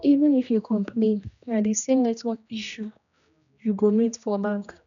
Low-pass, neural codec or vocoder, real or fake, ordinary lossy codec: 7.2 kHz; codec, 16 kHz, 1 kbps, X-Codec, HuBERT features, trained on general audio; fake; none